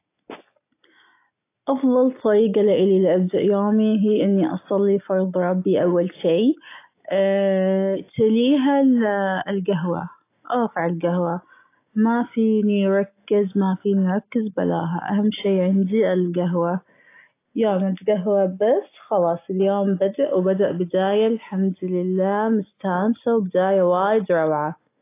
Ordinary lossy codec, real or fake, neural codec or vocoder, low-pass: AAC, 24 kbps; real; none; 3.6 kHz